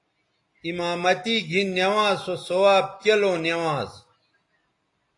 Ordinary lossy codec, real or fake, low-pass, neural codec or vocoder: AAC, 48 kbps; real; 10.8 kHz; none